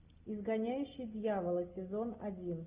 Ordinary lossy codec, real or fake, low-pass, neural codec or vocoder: Opus, 64 kbps; real; 3.6 kHz; none